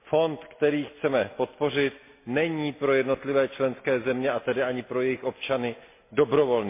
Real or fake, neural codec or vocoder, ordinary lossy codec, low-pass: real; none; MP3, 24 kbps; 3.6 kHz